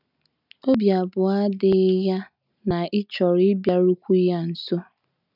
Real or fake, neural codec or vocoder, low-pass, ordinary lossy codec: real; none; 5.4 kHz; none